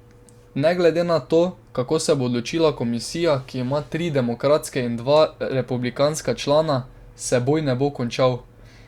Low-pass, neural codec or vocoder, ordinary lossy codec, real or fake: 19.8 kHz; none; Opus, 64 kbps; real